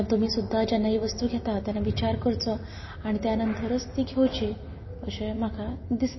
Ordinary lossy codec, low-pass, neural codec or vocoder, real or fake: MP3, 24 kbps; 7.2 kHz; none; real